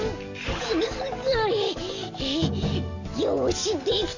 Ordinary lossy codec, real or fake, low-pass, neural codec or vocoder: none; fake; 7.2 kHz; codec, 44.1 kHz, 7.8 kbps, DAC